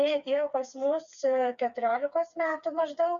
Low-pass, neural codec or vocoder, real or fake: 7.2 kHz; codec, 16 kHz, 4 kbps, FreqCodec, smaller model; fake